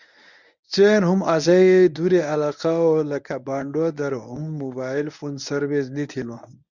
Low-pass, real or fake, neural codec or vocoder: 7.2 kHz; fake; codec, 24 kHz, 0.9 kbps, WavTokenizer, medium speech release version 1